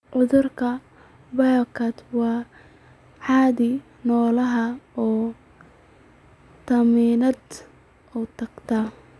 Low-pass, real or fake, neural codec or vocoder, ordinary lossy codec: none; real; none; none